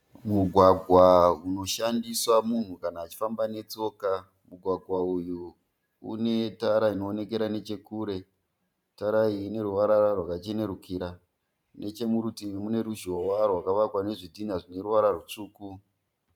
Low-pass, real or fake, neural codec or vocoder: 19.8 kHz; fake; vocoder, 44.1 kHz, 128 mel bands every 512 samples, BigVGAN v2